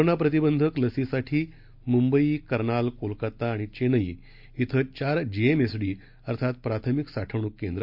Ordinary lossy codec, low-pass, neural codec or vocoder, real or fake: MP3, 48 kbps; 5.4 kHz; none; real